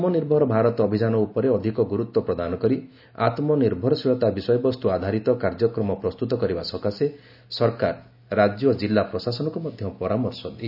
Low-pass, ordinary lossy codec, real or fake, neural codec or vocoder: 5.4 kHz; none; real; none